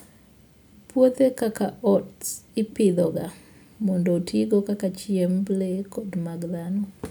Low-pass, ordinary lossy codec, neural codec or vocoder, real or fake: none; none; none; real